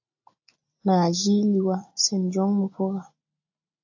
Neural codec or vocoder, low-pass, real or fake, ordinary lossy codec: none; 7.2 kHz; real; AAC, 48 kbps